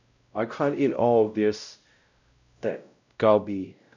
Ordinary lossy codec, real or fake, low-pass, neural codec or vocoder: none; fake; 7.2 kHz; codec, 16 kHz, 0.5 kbps, X-Codec, WavLM features, trained on Multilingual LibriSpeech